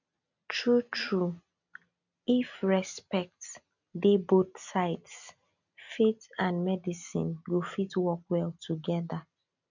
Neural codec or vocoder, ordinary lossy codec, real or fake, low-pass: none; none; real; 7.2 kHz